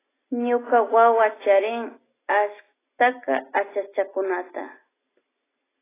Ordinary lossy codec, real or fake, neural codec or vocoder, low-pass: AAC, 16 kbps; real; none; 3.6 kHz